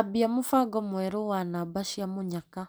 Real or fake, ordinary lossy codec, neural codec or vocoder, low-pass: real; none; none; none